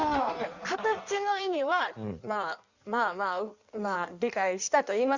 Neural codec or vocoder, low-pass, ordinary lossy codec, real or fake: codec, 16 kHz in and 24 kHz out, 1.1 kbps, FireRedTTS-2 codec; 7.2 kHz; Opus, 64 kbps; fake